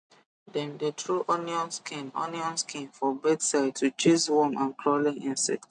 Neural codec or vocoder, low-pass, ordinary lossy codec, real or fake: none; 9.9 kHz; none; real